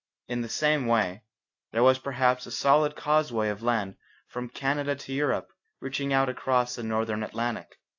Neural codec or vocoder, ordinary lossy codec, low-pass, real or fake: none; AAC, 48 kbps; 7.2 kHz; real